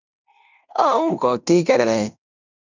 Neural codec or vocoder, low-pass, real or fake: codec, 16 kHz in and 24 kHz out, 0.9 kbps, LongCat-Audio-Codec, fine tuned four codebook decoder; 7.2 kHz; fake